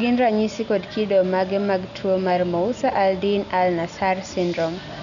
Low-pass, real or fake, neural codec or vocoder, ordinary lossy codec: 7.2 kHz; real; none; none